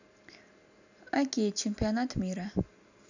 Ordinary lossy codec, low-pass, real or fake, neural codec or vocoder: none; 7.2 kHz; real; none